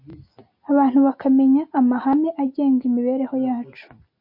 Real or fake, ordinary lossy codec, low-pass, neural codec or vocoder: real; MP3, 32 kbps; 5.4 kHz; none